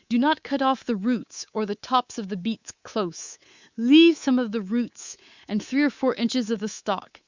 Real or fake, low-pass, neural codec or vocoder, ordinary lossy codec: fake; 7.2 kHz; codec, 24 kHz, 3.1 kbps, DualCodec; Opus, 64 kbps